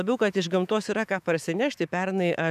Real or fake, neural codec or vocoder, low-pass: fake; autoencoder, 48 kHz, 128 numbers a frame, DAC-VAE, trained on Japanese speech; 14.4 kHz